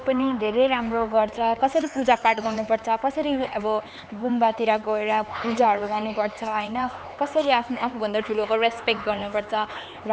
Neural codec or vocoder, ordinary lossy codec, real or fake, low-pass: codec, 16 kHz, 4 kbps, X-Codec, HuBERT features, trained on LibriSpeech; none; fake; none